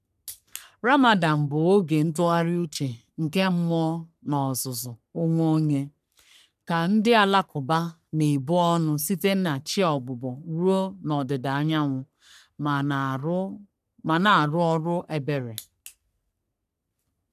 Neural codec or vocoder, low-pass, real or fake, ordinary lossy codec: codec, 44.1 kHz, 3.4 kbps, Pupu-Codec; 14.4 kHz; fake; none